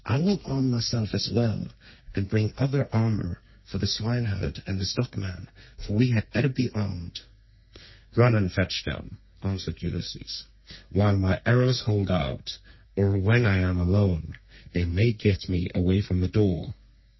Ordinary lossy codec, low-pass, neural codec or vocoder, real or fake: MP3, 24 kbps; 7.2 kHz; codec, 32 kHz, 1.9 kbps, SNAC; fake